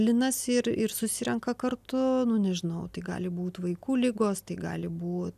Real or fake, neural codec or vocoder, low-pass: real; none; 14.4 kHz